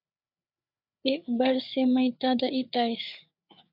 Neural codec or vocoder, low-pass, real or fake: codec, 16 kHz, 4 kbps, FreqCodec, larger model; 5.4 kHz; fake